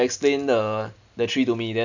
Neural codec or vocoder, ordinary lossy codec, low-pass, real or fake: none; none; 7.2 kHz; real